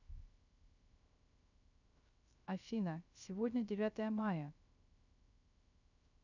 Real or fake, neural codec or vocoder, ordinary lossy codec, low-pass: fake; codec, 16 kHz, 0.3 kbps, FocalCodec; none; 7.2 kHz